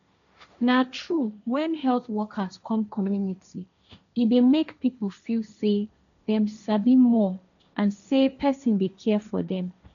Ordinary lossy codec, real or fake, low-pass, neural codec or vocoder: none; fake; 7.2 kHz; codec, 16 kHz, 1.1 kbps, Voila-Tokenizer